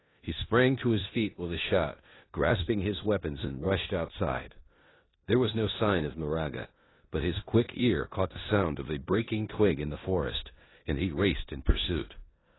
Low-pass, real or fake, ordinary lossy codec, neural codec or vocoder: 7.2 kHz; fake; AAC, 16 kbps; codec, 16 kHz in and 24 kHz out, 0.9 kbps, LongCat-Audio-Codec, four codebook decoder